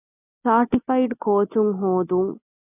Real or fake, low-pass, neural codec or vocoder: real; 3.6 kHz; none